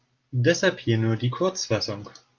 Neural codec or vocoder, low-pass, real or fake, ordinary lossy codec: none; 7.2 kHz; real; Opus, 32 kbps